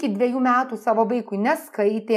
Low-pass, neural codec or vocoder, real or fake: 14.4 kHz; none; real